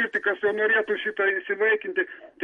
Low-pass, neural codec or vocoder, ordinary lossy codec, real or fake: 19.8 kHz; none; MP3, 48 kbps; real